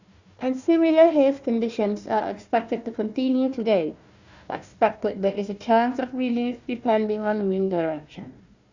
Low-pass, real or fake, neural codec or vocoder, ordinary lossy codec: 7.2 kHz; fake; codec, 16 kHz, 1 kbps, FunCodec, trained on Chinese and English, 50 frames a second; Opus, 64 kbps